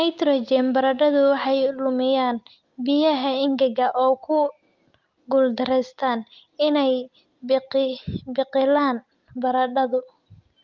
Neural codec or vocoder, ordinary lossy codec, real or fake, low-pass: none; Opus, 24 kbps; real; 7.2 kHz